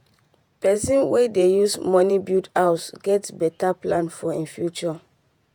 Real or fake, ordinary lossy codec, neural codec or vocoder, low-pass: fake; none; vocoder, 48 kHz, 128 mel bands, Vocos; 19.8 kHz